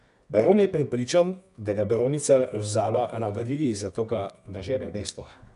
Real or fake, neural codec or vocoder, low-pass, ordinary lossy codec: fake; codec, 24 kHz, 0.9 kbps, WavTokenizer, medium music audio release; 10.8 kHz; none